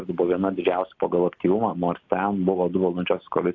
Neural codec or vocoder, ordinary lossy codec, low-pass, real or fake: none; AAC, 48 kbps; 7.2 kHz; real